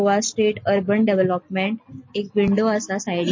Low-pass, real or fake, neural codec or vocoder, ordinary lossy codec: 7.2 kHz; real; none; MP3, 32 kbps